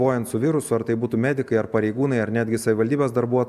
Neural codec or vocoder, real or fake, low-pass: none; real; 14.4 kHz